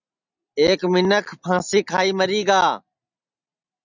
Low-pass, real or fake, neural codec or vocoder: 7.2 kHz; real; none